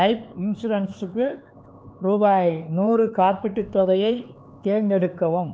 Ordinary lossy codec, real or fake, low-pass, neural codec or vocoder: none; fake; none; codec, 16 kHz, 4 kbps, X-Codec, HuBERT features, trained on LibriSpeech